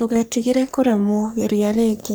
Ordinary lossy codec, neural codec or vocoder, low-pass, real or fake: none; codec, 44.1 kHz, 3.4 kbps, Pupu-Codec; none; fake